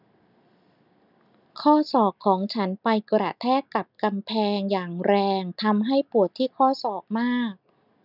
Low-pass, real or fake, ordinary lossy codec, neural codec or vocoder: 5.4 kHz; real; none; none